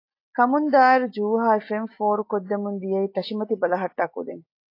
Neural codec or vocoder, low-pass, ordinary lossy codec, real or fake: none; 5.4 kHz; AAC, 32 kbps; real